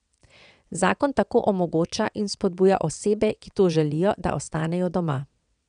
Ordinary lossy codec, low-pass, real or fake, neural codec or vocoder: none; 9.9 kHz; fake; vocoder, 22.05 kHz, 80 mel bands, WaveNeXt